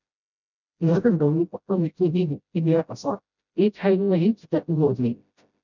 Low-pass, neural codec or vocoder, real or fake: 7.2 kHz; codec, 16 kHz, 0.5 kbps, FreqCodec, smaller model; fake